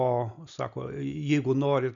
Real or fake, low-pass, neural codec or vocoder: real; 7.2 kHz; none